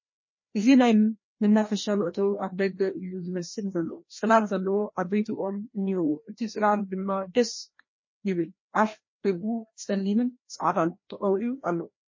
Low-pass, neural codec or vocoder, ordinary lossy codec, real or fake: 7.2 kHz; codec, 16 kHz, 1 kbps, FreqCodec, larger model; MP3, 32 kbps; fake